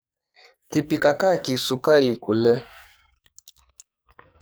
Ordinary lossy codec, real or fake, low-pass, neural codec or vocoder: none; fake; none; codec, 44.1 kHz, 2.6 kbps, SNAC